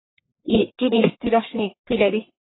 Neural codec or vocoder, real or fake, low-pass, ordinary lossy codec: codec, 44.1 kHz, 1.7 kbps, Pupu-Codec; fake; 7.2 kHz; AAC, 16 kbps